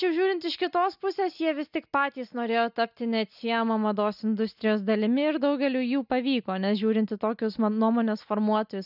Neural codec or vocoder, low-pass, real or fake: none; 5.4 kHz; real